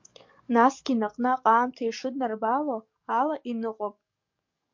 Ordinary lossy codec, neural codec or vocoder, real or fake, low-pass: MP3, 48 kbps; codec, 44.1 kHz, 7.8 kbps, DAC; fake; 7.2 kHz